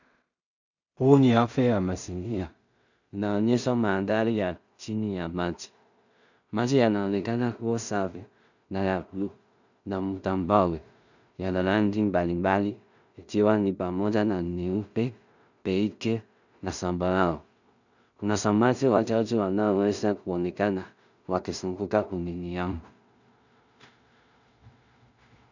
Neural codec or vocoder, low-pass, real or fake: codec, 16 kHz in and 24 kHz out, 0.4 kbps, LongCat-Audio-Codec, two codebook decoder; 7.2 kHz; fake